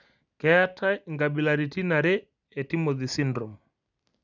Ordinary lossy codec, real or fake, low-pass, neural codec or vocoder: none; real; 7.2 kHz; none